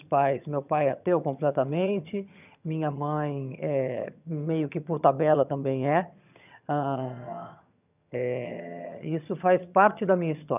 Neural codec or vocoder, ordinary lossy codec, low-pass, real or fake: vocoder, 22.05 kHz, 80 mel bands, HiFi-GAN; none; 3.6 kHz; fake